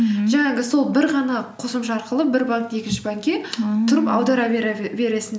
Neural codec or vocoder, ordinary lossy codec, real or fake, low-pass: none; none; real; none